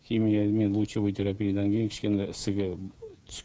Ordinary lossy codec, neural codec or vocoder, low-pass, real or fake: none; codec, 16 kHz, 8 kbps, FreqCodec, smaller model; none; fake